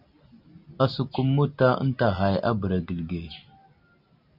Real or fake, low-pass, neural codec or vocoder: real; 5.4 kHz; none